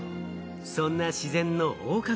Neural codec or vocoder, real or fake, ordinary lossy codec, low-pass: none; real; none; none